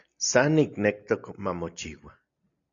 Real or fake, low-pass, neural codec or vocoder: real; 7.2 kHz; none